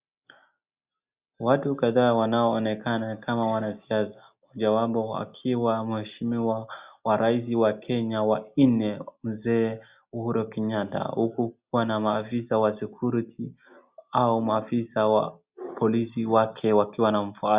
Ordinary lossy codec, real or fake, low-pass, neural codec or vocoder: Opus, 64 kbps; real; 3.6 kHz; none